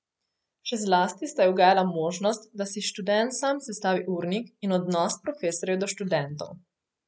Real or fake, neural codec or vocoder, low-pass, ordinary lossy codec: real; none; none; none